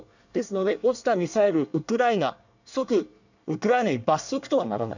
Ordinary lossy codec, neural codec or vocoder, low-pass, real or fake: none; codec, 24 kHz, 1 kbps, SNAC; 7.2 kHz; fake